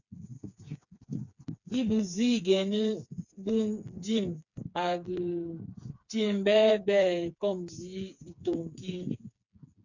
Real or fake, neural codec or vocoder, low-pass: fake; codec, 16 kHz, 4 kbps, FreqCodec, smaller model; 7.2 kHz